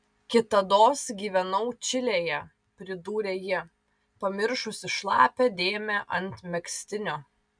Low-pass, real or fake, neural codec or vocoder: 9.9 kHz; real; none